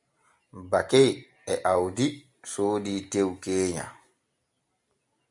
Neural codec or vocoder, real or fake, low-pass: none; real; 10.8 kHz